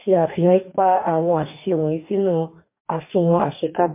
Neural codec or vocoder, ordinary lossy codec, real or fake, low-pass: codec, 44.1 kHz, 2.6 kbps, DAC; MP3, 32 kbps; fake; 3.6 kHz